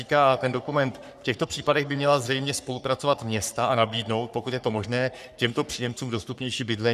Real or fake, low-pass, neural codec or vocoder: fake; 14.4 kHz; codec, 44.1 kHz, 3.4 kbps, Pupu-Codec